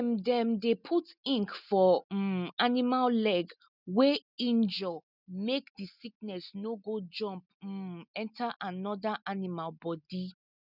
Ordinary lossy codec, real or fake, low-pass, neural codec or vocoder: none; real; 5.4 kHz; none